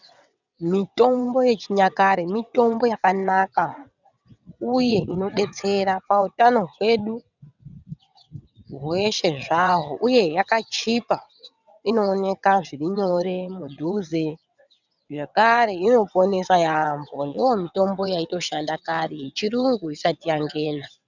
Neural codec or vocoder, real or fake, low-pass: vocoder, 22.05 kHz, 80 mel bands, WaveNeXt; fake; 7.2 kHz